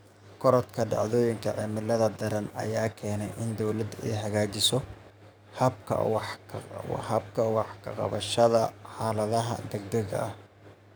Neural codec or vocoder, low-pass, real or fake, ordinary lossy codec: codec, 44.1 kHz, 7.8 kbps, DAC; none; fake; none